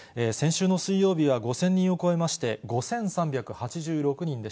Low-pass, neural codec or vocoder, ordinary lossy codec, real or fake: none; none; none; real